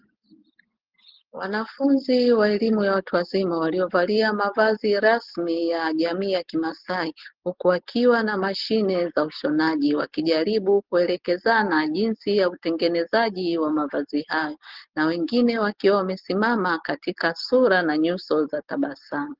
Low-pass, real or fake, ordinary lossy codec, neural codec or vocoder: 5.4 kHz; real; Opus, 16 kbps; none